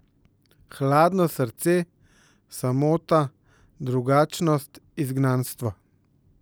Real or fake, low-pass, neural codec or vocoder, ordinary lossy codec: real; none; none; none